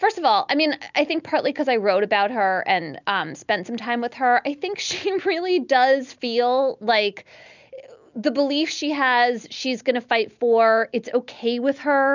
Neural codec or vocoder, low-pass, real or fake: none; 7.2 kHz; real